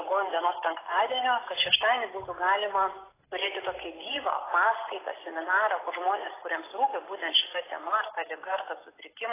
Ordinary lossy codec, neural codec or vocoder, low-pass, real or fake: AAC, 16 kbps; none; 3.6 kHz; real